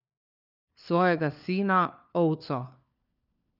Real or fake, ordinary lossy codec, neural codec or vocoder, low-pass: fake; none; codec, 16 kHz, 4 kbps, FunCodec, trained on LibriTTS, 50 frames a second; 5.4 kHz